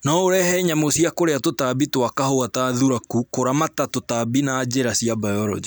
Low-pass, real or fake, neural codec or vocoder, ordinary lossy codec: none; real; none; none